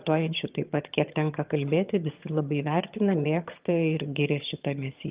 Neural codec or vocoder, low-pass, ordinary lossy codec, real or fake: vocoder, 22.05 kHz, 80 mel bands, HiFi-GAN; 3.6 kHz; Opus, 32 kbps; fake